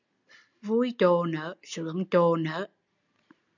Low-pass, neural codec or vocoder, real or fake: 7.2 kHz; none; real